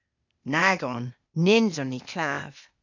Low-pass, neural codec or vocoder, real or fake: 7.2 kHz; codec, 16 kHz, 0.8 kbps, ZipCodec; fake